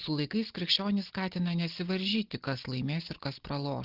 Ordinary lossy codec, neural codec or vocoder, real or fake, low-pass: Opus, 16 kbps; none; real; 5.4 kHz